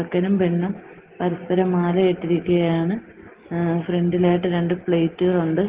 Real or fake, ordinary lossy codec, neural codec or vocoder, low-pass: real; Opus, 16 kbps; none; 3.6 kHz